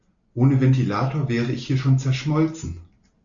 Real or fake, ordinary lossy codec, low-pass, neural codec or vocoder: real; MP3, 64 kbps; 7.2 kHz; none